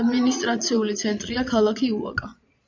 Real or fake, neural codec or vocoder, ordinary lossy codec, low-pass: fake; vocoder, 22.05 kHz, 80 mel bands, Vocos; MP3, 64 kbps; 7.2 kHz